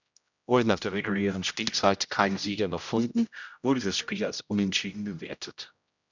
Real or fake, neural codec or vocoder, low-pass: fake; codec, 16 kHz, 0.5 kbps, X-Codec, HuBERT features, trained on general audio; 7.2 kHz